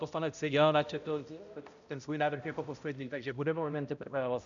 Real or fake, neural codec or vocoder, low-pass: fake; codec, 16 kHz, 0.5 kbps, X-Codec, HuBERT features, trained on balanced general audio; 7.2 kHz